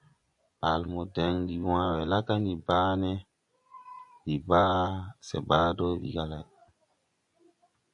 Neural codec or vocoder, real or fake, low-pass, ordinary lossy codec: vocoder, 24 kHz, 100 mel bands, Vocos; fake; 10.8 kHz; AAC, 64 kbps